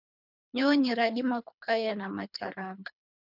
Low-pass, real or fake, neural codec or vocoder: 5.4 kHz; fake; codec, 24 kHz, 3 kbps, HILCodec